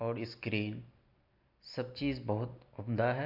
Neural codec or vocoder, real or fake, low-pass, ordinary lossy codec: none; real; 5.4 kHz; MP3, 48 kbps